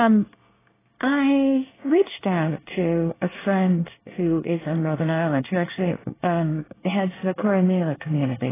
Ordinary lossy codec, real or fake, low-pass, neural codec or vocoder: AAC, 16 kbps; fake; 3.6 kHz; codec, 24 kHz, 1 kbps, SNAC